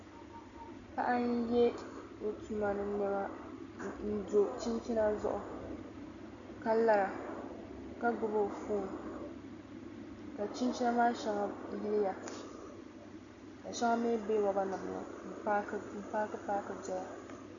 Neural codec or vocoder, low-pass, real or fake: none; 7.2 kHz; real